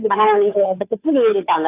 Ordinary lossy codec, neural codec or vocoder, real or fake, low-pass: AAC, 24 kbps; codec, 16 kHz, 2 kbps, FunCodec, trained on Chinese and English, 25 frames a second; fake; 3.6 kHz